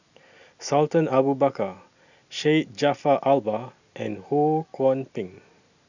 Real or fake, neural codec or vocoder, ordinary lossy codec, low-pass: real; none; none; 7.2 kHz